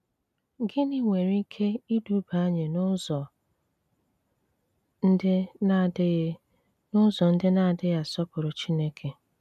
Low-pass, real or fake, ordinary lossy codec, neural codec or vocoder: 14.4 kHz; real; none; none